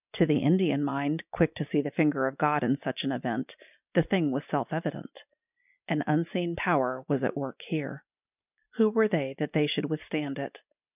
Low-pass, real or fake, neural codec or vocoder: 3.6 kHz; real; none